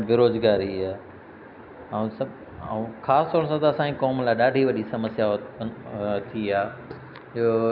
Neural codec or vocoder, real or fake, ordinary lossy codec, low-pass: none; real; none; 5.4 kHz